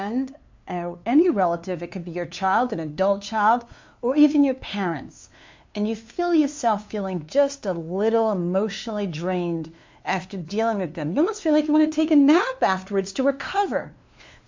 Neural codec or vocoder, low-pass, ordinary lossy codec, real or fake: codec, 16 kHz, 2 kbps, FunCodec, trained on LibriTTS, 25 frames a second; 7.2 kHz; MP3, 48 kbps; fake